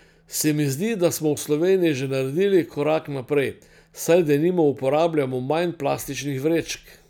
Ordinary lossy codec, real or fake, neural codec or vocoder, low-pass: none; real; none; none